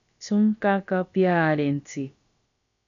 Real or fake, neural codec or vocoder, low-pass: fake; codec, 16 kHz, about 1 kbps, DyCAST, with the encoder's durations; 7.2 kHz